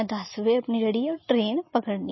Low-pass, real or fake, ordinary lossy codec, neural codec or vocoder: 7.2 kHz; real; MP3, 24 kbps; none